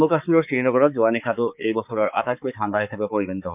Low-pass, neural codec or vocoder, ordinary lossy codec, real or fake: 3.6 kHz; codec, 16 kHz, 4 kbps, X-Codec, HuBERT features, trained on balanced general audio; none; fake